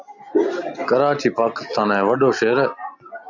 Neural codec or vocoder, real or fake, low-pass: none; real; 7.2 kHz